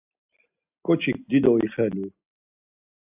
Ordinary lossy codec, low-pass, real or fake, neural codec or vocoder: AAC, 32 kbps; 3.6 kHz; real; none